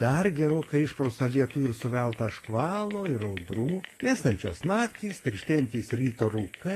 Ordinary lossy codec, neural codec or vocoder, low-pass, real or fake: AAC, 48 kbps; codec, 44.1 kHz, 2.6 kbps, SNAC; 14.4 kHz; fake